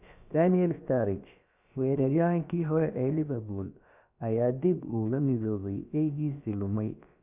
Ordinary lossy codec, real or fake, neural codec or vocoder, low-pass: none; fake; codec, 16 kHz, about 1 kbps, DyCAST, with the encoder's durations; 3.6 kHz